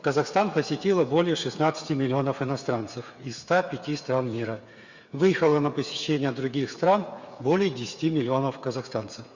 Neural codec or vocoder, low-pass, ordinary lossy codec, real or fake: codec, 16 kHz, 8 kbps, FreqCodec, smaller model; 7.2 kHz; Opus, 64 kbps; fake